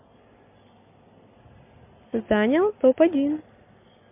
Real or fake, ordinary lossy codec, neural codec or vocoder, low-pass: real; MP3, 32 kbps; none; 3.6 kHz